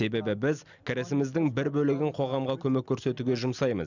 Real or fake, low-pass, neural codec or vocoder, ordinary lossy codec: real; 7.2 kHz; none; none